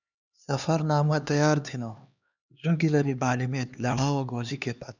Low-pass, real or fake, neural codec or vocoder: 7.2 kHz; fake; codec, 16 kHz, 2 kbps, X-Codec, HuBERT features, trained on LibriSpeech